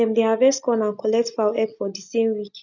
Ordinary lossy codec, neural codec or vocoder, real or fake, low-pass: none; none; real; 7.2 kHz